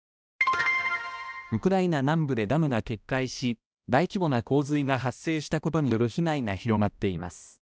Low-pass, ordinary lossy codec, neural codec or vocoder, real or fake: none; none; codec, 16 kHz, 1 kbps, X-Codec, HuBERT features, trained on balanced general audio; fake